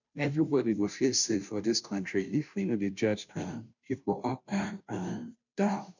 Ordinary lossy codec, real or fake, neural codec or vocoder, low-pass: none; fake; codec, 16 kHz, 0.5 kbps, FunCodec, trained on Chinese and English, 25 frames a second; 7.2 kHz